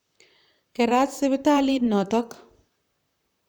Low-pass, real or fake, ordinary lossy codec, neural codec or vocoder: none; fake; none; vocoder, 44.1 kHz, 128 mel bands, Pupu-Vocoder